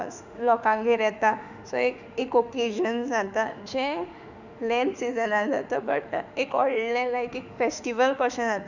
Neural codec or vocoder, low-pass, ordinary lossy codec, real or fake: autoencoder, 48 kHz, 32 numbers a frame, DAC-VAE, trained on Japanese speech; 7.2 kHz; none; fake